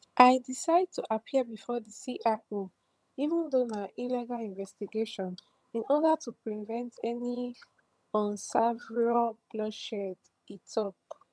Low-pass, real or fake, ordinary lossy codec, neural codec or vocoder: none; fake; none; vocoder, 22.05 kHz, 80 mel bands, HiFi-GAN